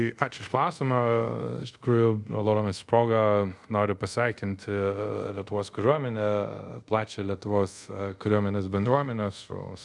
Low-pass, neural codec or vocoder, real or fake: 10.8 kHz; codec, 24 kHz, 0.5 kbps, DualCodec; fake